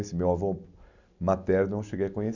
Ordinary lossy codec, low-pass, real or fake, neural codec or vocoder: none; 7.2 kHz; real; none